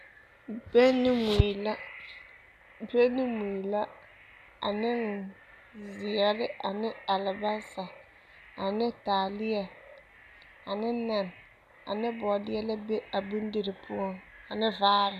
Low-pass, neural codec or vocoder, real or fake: 14.4 kHz; none; real